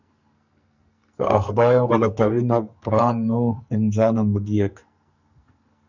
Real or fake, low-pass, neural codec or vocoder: fake; 7.2 kHz; codec, 44.1 kHz, 2.6 kbps, SNAC